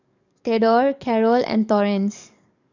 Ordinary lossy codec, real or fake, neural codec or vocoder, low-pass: none; fake; codec, 44.1 kHz, 7.8 kbps, DAC; 7.2 kHz